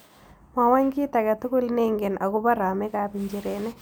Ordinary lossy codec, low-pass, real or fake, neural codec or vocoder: none; none; real; none